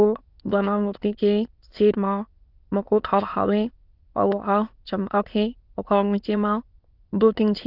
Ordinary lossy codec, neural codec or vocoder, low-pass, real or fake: Opus, 32 kbps; autoencoder, 22.05 kHz, a latent of 192 numbers a frame, VITS, trained on many speakers; 5.4 kHz; fake